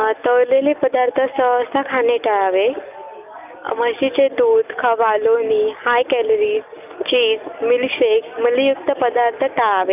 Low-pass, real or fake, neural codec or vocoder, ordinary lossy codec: 3.6 kHz; real; none; none